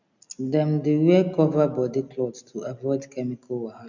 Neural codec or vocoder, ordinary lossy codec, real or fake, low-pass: none; none; real; 7.2 kHz